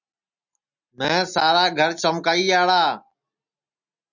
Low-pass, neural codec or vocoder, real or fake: 7.2 kHz; none; real